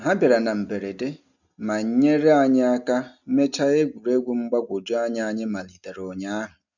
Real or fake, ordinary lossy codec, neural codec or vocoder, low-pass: real; none; none; 7.2 kHz